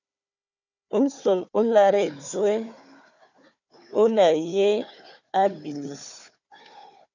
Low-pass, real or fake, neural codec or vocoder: 7.2 kHz; fake; codec, 16 kHz, 4 kbps, FunCodec, trained on Chinese and English, 50 frames a second